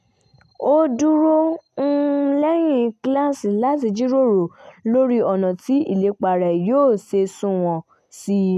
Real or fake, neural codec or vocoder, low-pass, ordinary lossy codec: real; none; 14.4 kHz; none